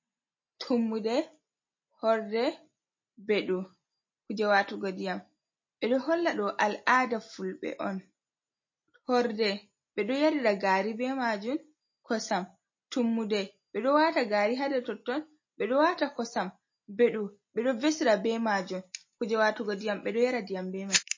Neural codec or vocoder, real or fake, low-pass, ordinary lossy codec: none; real; 7.2 kHz; MP3, 32 kbps